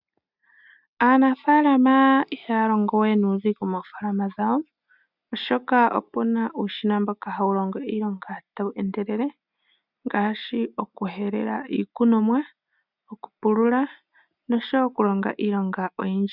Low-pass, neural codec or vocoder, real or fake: 5.4 kHz; none; real